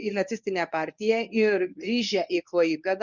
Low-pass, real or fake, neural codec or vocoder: 7.2 kHz; fake; codec, 24 kHz, 0.9 kbps, WavTokenizer, medium speech release version 1